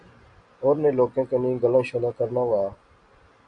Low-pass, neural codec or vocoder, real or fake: 9.9 kHz; none; real